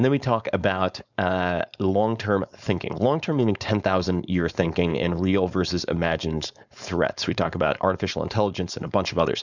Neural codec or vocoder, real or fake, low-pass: codec, 16 kHz, 4.8 kbps, FACodec; fake; 7.2 kHz